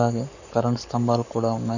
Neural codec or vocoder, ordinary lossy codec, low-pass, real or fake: codec, 44.1 kHz, 7.8 kbps, Pupu-Codec; none; 7.2 kHz; fake